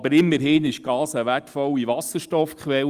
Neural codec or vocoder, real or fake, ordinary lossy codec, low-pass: none; real; Opus, 32 kbps; 14.4 kHz